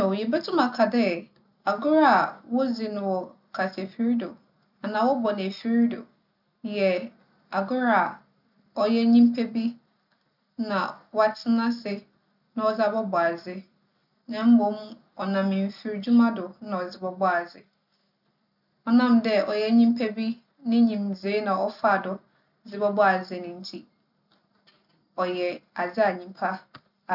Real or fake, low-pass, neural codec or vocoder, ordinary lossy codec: real; 5.4 kHz; none; none